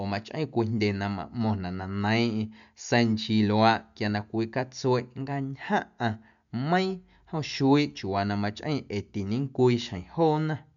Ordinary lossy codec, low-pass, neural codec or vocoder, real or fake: none; 7.2 kHz; none; real